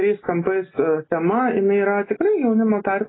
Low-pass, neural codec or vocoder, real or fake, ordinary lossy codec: 7.2 kHz; none; real; AAC, 16 kbps